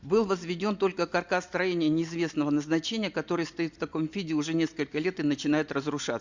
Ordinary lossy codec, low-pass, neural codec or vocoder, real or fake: Opus, 64 kbps; 7.2 kHz; none; real